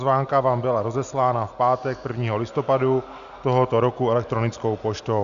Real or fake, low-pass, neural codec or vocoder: real; 7.2 kHz; none